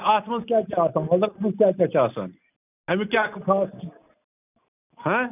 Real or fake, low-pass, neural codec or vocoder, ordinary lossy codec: real; 3.6 kHz; none; none